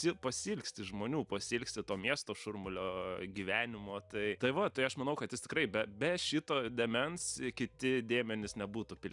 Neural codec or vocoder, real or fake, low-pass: none; real; 10.8 kHz